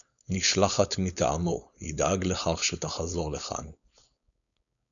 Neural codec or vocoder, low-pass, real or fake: codec, 16 kHz, 4.8 kbps, FACodec; 7.2 kHz; fake